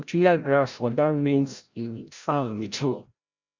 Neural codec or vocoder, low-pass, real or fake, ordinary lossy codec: codec, 16 kHz, 0.5 kbps, FreqCodec, larger model; 7.2 kHz; fake; Opus, 64 kbps